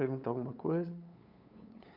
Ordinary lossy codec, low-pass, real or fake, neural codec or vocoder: none; 5.4 kHz; fake; codec, 16 kHz, 8 kbps, FunCodec, trained on LibriTTS, 25 frames a second